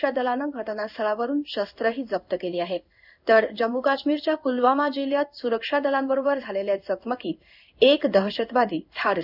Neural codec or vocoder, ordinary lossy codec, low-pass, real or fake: codec, 16 kHz in and 24 kHz out, 1 kbps, XY-Tokenizer; none; 5.4 kHz; fake